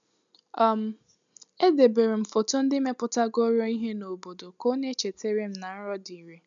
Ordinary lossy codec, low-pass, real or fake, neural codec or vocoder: none; 7.2 kHz; real; none